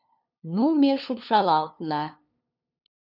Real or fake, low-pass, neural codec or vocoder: fake; 5.4 kHz; codec, 16 kHz, 2 kbps, FunCodec, trained on LibriTTS, 25 frames a second